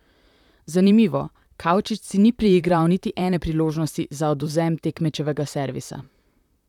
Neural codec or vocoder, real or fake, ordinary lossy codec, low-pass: vocoder, 44.1 kHz, 128 mel bands, Pupu-Vocoder; fake; none; 19.8 kHz